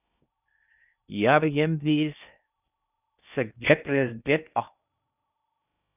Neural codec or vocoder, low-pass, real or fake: codec, 16 kHz in and 24 kHz out, 0.6 kbps, FocalCodec, streaming, 2048 codes; 3.6 kHz; fake